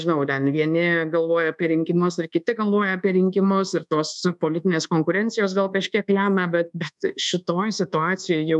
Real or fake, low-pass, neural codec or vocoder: fake; 10.8 kHz; codec, 24 kHz, 1.2 kbps, DualCodec